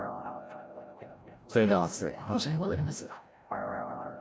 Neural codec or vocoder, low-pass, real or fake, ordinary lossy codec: codec, 16 kHz, 0.5 kbps, FreqCodec, larger model; none; fake; none